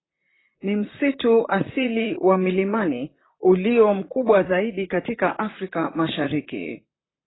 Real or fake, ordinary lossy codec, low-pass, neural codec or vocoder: fake; AAC, 16 kbps; 7.2 kHz; vocoder, 44.1 kHz, 128 mel bands, Pupu-Vocoder